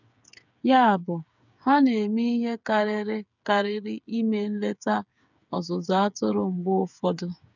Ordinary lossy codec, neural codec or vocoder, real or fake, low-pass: none; codec, 16 kHz, 8 kbps, FreqCodec, smaller model; fake; 7.2 kHz